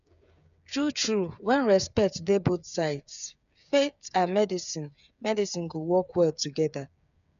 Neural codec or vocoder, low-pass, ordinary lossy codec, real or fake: codec, 16 kHz, 8 kbps, FreqCodec, smaller model; 7.2 kHz; none; fake